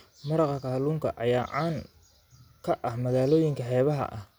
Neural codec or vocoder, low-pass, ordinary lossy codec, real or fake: none; none; none; real